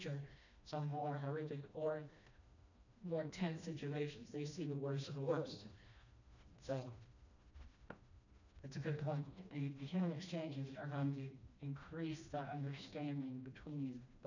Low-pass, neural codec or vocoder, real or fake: 7.2 kHz; codec, 16 kHz, 1 kbps, FreqCodec, smaller model; fake